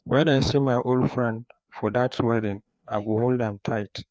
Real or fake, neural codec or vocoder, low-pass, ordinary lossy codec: fake; codec, 16 kHz, 2 kbps, FreqCodec, larger model; none; none